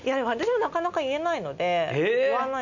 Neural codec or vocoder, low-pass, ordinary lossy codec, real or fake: none; 7.2 kHz; none; real